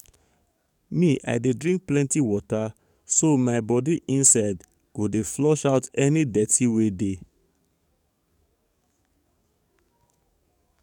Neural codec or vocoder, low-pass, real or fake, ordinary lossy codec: codec, 44.1 kHz, 7.8 kbps, DAC; 19.8 kHz; fake; none